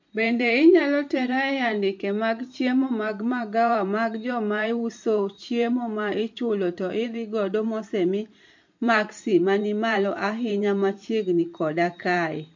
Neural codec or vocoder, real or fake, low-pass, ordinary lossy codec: vocoder, 44.1 kHz, 128 mel bands every 512 samples, BigVGAN v2; fake; 7.2 kHz; MP3, 48 kbps